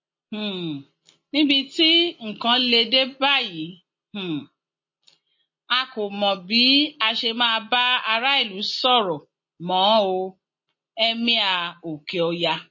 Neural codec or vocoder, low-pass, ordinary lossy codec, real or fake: none; 7.2 kHz; MP3, 32 kbps; real